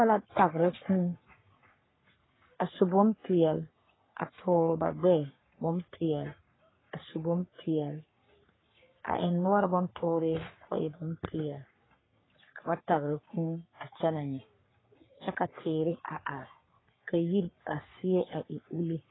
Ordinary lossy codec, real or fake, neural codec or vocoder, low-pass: AAC, 16 kbps; fake; codec, 44.1 kHz, 3.4 kbps, Pupu-Codec; 7.2 kHz